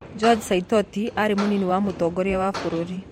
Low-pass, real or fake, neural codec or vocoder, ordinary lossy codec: 19.8 kHz; fake; vocoder, 44.1 kHz, 128 mel bands every 512 samples, BigVGAN v2; MP3, 64 kbps